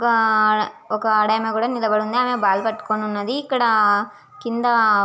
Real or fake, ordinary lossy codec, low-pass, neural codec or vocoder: real; none; none; none